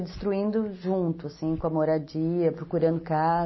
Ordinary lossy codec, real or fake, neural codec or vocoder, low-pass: MP3, 24 kbps; real; none; 7.2 kHz